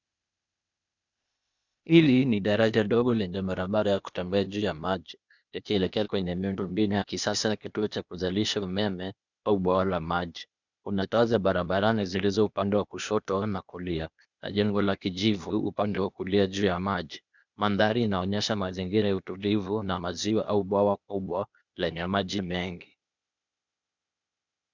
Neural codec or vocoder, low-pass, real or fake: codec, 16 kHz, 0.8 kbps, ZipCodec; 7.2 kHz; fake